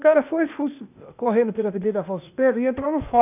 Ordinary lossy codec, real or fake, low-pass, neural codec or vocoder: none; fake; 3.6 kHz; codec, 16 kHz, 0.8 kbps, ZipCodec